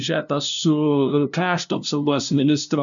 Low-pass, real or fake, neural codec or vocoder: 7.2 kHz; fake; codec, 16 kHz, 0.5 kbps, FunCodec, trained on LibriTTS, 25 frames a second